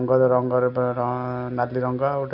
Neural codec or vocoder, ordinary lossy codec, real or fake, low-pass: none; none; real; 5.4 kHz